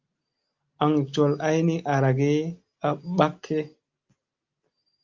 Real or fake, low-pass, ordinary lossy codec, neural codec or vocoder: real; 7.2 kHz; Opus, 32 kbps; none